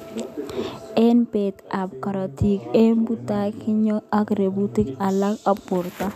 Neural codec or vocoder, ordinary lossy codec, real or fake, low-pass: none; none; real; 14.4 kHz